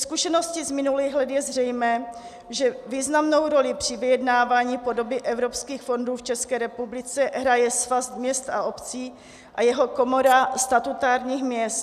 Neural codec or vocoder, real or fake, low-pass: none; real; 14.4 kHz